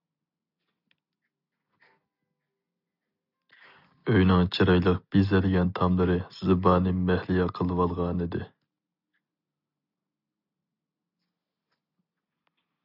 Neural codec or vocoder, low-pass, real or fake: none; 5.4 kHz; real